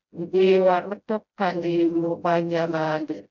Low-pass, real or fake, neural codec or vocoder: 7.2 kHz; fake; codec, 16 kHz, 0.5 kbps, FreqCodec, smaller model